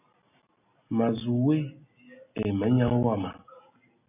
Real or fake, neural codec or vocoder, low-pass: real; none; 3.6 kHz